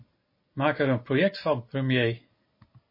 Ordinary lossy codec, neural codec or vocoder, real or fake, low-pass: MP3, 24 kbps; none; real; 5.4 kHz